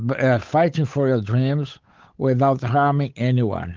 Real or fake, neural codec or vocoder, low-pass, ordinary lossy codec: fake; codec, 16 kHz, 8 kbps, FunCodec, trained on Chinese and English, 25 frames a second; 7.2 kHz; Opus, 32 kbps